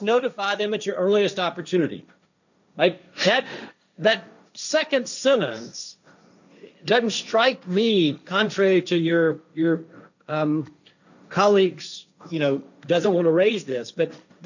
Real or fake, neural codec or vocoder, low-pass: fake; codec, 16 kHz, 1.1 kbps, Voila-Tokenizer; 7.2 kHz